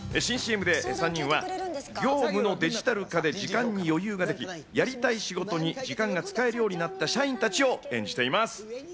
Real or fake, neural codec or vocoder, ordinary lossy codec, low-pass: real; none; none; none